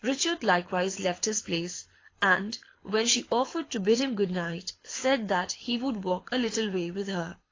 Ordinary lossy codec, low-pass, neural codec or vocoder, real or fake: AAC, 32 kbps; 7.2 kHz; codec, 24 kHz, 6 kbps, HILCodec; fake